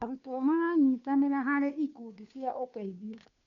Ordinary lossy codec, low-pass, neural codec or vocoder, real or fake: none; 7.2 kHz; codec, 16 kHz, 2 kbps, FunCodec, trained on Chinese and English, 25 frames a second; fake